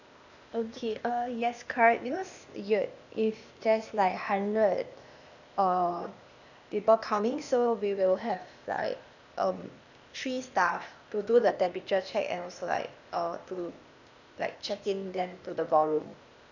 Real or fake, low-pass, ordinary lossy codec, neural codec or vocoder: fake; 7.2 kHz; none; codec, 16 kHz, 0.8 kbps, ZipCodec